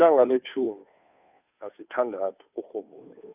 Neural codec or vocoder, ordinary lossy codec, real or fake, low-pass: codec, 16 kHz, 2 kbps, FunCodec, trained on Chinese and English, 25 frames a second; none; fake; 3.6 kHz